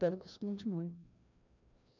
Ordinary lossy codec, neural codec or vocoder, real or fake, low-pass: none; codec, 16 kHz, 1 kbps, FreqCodec, larger model; fake; 7.2 kHz